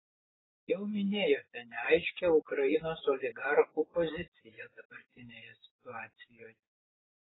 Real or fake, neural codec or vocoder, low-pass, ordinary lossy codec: fake; vocoder, 24 kHz, 100 mel bands, Vocos; 7.2 kHz; AAC, 16 kbps